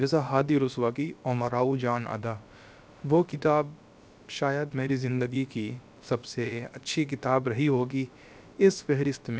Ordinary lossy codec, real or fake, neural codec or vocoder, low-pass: none; fake; codec, 16 kHz, about 1 kbps, DyCAST, with the encoder's durations; none